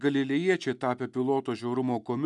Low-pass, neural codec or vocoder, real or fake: 10.8 kHz; none; real